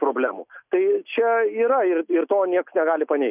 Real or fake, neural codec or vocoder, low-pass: real; none; 3.6 kHz